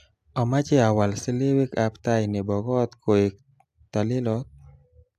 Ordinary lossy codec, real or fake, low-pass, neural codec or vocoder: AAC, 96 kbps; real; 14.4 kHz; none